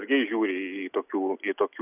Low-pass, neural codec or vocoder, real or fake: 3.6 kHz; none; real